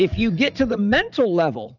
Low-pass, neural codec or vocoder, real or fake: 7.2 kHz; vocoder, 44.1 kHz, 80 mel bands, Vocos; fake